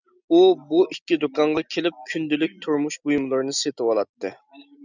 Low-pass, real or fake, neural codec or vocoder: 7.2 kHz; real; none